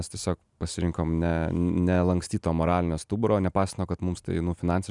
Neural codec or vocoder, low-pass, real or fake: none; 10.8 kHz; real